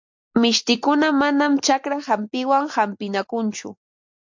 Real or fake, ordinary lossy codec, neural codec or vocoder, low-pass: real; MP3, 48 kbps; none; 7.2 kHz